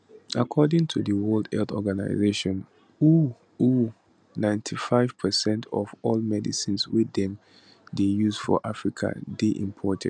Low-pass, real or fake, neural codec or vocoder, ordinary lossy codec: 9.9 kHz; real; none; none